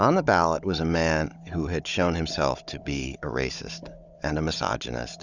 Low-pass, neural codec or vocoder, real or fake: 7.2 kHz; codec, 16 kHz, 16 kbps, FunCodec, trained on Chinese and English, 50 frames a second; fake